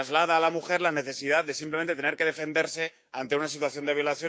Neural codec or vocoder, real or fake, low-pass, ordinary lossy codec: codec, 16 kHz, 6 kbps, DAC; fake; none; none